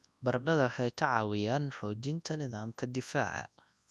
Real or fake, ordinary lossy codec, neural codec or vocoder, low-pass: fake; none; codec, 24 kHz, 0.9 kbps, WavTokenizer, large speech release; none